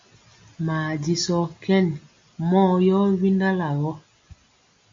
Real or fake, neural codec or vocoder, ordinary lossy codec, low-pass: real; none; MP3, 48 kbps; 7.2 kHz